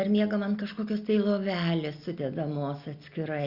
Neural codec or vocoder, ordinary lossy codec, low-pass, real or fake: none; Opus, 64 kbps; 5.4 kHz; real